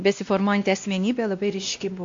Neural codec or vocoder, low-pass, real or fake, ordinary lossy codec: codec, 16 kHz, 1 kbps, X-Codec, WavLM features, trained on Multilingual LibriSpeech; 7.2 kHz; fake; AAC, 48 kbps